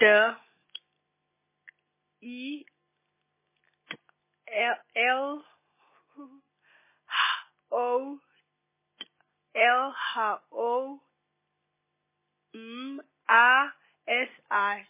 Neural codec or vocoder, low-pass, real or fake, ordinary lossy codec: none; 3.6 kHz; real; MP3, 16 kbps